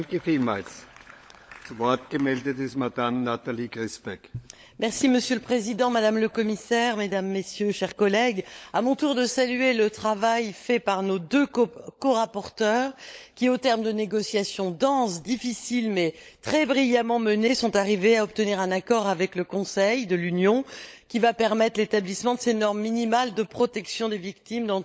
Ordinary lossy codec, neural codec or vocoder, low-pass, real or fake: none; codec, 16 kHz, 16 kbps, FunCodec, trained on LibriTTS, 50 frames a second; none; fake